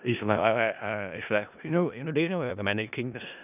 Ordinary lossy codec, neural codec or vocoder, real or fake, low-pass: none; codec, 16 kHz in and 24 kHz out, 0.4 kbps, LongCat-Audio-Codec, four codebook decoder; fake; 3.6 kHz